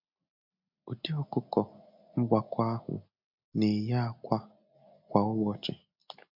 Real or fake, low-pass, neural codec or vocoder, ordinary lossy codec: real; 5.4 kHz; none; MP3, 48 kbps